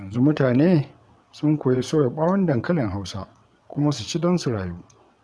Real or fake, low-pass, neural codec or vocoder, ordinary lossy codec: fake; none; vocoder, 22.05 kHz, 80 mel bands, WaveNeXt; none